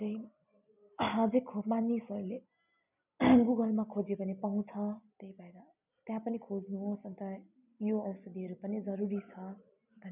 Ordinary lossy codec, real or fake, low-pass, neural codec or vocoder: none; real; 3.6 kHz; none